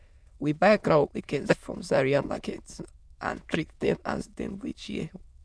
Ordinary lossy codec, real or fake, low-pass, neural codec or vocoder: none; fake; none; autoencoder, 22.05 kHz, a latent of 192 numbers a frame, VITS, trained on many speakers